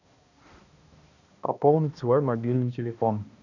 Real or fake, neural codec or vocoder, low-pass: fake; codec, 16 kHz, 1 kbps, X-Codec, HuBERT features, trained on balanced general audio; 7.2 kHz